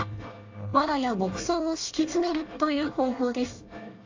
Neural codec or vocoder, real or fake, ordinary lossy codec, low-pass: codec, 24 kHz, 1 kbps, SNAC; fake; AAC, 48 kbps; 7.2 kHz